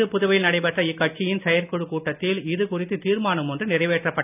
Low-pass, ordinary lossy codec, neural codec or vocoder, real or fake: 3.6 kHz; none; none; real